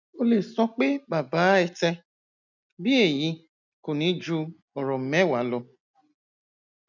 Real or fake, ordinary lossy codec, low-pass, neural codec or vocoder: real; none; 7.2 kHz; none